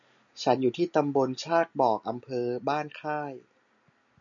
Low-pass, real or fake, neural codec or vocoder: 7.2 kHz; real; none